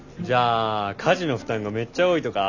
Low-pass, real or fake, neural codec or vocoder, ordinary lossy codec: 7.2 kHz; real; none; none